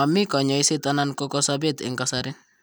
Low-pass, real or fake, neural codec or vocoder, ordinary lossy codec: none; real; none; none